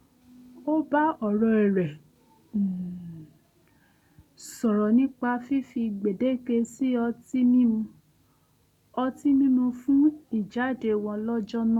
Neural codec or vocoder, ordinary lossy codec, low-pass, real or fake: none; none; 19.8 kHz; real